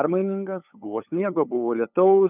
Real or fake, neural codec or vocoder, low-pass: fake; codec, 16 kHz, 8 kbps, FunCodec, trained on LibriTTS, 25 frames a second; 3.6 kHz